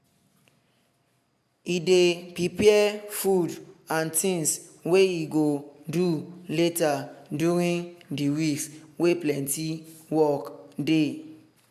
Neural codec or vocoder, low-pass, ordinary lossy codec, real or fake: none; 14.4 kHz; MP3, 96 kbps; real